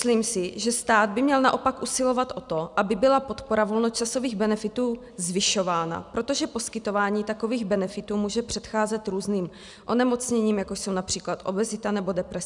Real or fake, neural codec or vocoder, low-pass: real; none; 10.8 kHz